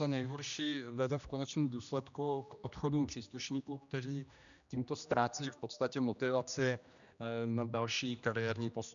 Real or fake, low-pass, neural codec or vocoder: fake; 7.2 kHz; codec, 16 kHz, 1 kbps, X-Codec, HuBERT features, trained on general audio